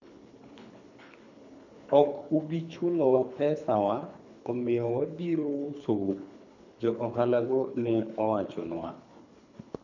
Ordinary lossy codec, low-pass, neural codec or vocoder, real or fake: none; 7.2 kHz; codec, 24 kHz, 3 kbps, HILCodec; fake